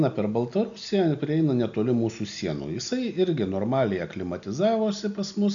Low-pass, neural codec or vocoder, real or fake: 7.2 kHz; none; real